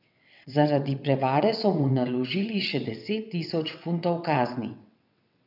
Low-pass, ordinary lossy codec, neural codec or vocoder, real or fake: 5.4 kHz; none; vocoder, 22.05 kHz, 80 mel bands, WaveNeXt; fake